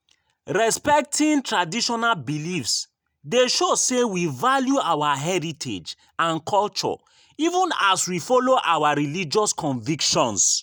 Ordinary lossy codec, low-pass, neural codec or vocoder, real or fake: none; none; none; real